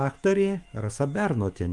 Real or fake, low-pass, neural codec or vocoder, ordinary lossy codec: fake; 10.8 kHz; autoencoder, 48 kHz, 128 numbers a frame, DAC-VAE, trained on Japanese speech; Opus, 32 kbps